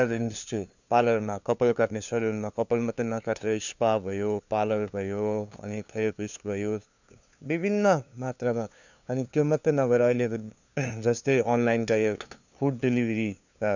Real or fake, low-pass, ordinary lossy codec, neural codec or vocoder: fake; 7.2 kHz; none; codec, 16 kHz, 2 kbps, FunCodec, trained on LibriTTS, 25 frames a second